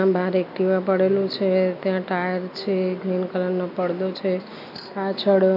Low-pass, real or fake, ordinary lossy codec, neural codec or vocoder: 5.4 kHz; real; none; none